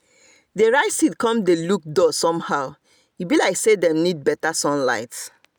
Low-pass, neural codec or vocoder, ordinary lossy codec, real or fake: none; none; none; real